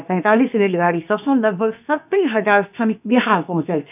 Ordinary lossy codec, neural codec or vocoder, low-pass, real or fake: none; codec, 16 kHz, about 1 kbps, DyCAST, with the encoder's durations; 3.6 kHz; fake